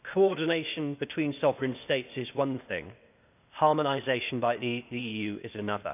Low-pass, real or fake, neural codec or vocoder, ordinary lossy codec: 3.6 kHz; fake; codec, 16 kHz, 0.8 kbps, ZipCodec; none